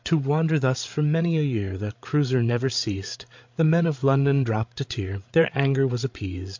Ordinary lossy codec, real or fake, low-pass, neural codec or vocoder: MP3, 48 kbps; fake; 7.2 kHz; codec, 16 kHz, 16 kbps, FreqCodec, larger model